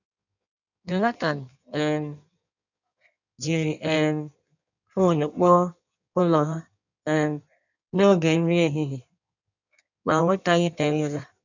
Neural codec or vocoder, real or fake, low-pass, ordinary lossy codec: codec, 16 kHz in and 24 kHz out, 1.1 kbps, FireRedTTS-2 codec; fake; 7.2 kHz; none